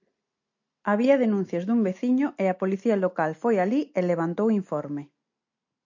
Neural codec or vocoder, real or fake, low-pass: none; real; 7.2 kHz